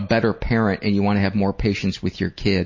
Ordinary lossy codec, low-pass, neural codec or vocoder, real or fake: MP3, 32 kbps; 7.2 kHz; none; real